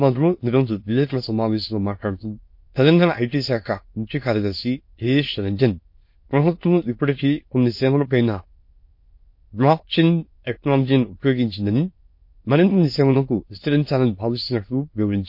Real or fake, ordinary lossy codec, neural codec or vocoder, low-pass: fake; MP3, 32 kbps; autoencoder, 22.05 kHz, a latent of 192 numbers a frame, VITS, trained on many speakers; 5.4 kHz